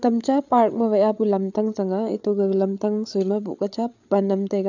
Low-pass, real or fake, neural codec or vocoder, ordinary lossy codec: 7.2 kHz; fake; codec, 16 kHz, 8 kbps, FreqCodec, larger model; none